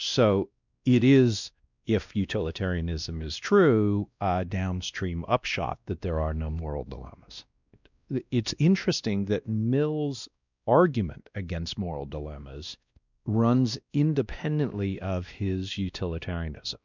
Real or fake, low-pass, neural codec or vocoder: fake; 7.2 kHz; codec, 16 kHz, 1 kbps, X-Codec, WavLM features, trained on Multilingual LibriSpeech